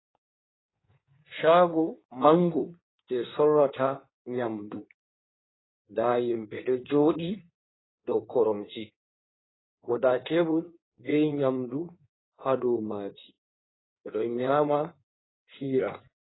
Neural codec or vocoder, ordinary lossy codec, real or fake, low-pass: codec, 16 kHz in and 24 kHz out, 1.1 kbps, FireRedTTS-2 codec; AAC, 16 kbps; fake; 7.2 kHz